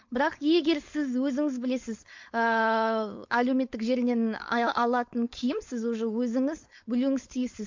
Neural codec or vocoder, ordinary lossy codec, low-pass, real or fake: codec, 16 kHz, 4.8 kbps, FACodec; MP3, 48 kbps; 7.2 kHz; fake